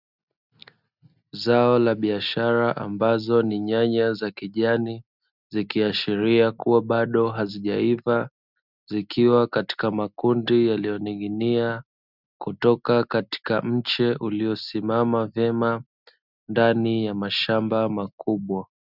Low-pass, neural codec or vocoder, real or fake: 5.4 kHz; none; real